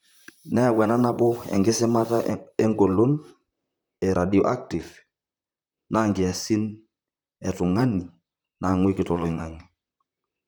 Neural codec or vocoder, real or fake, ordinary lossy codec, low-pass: vocoder, 44.1 kHz, 128 mel bands, Pupu-Vocoder; fake; none; none